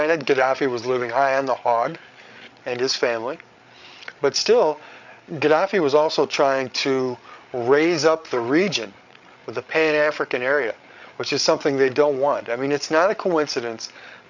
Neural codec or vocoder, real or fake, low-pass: codec, 16 kHz, 8 kbps, FreqCodec, larger model; fake; 7.2 kHz